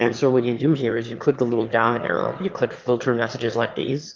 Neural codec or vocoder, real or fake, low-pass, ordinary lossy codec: autoencoder, 22.05 kHz, a latent of 192 numbers a frame, VITS, trained on one speaker; fake; 7.2 kHz; Opus, 32 kbps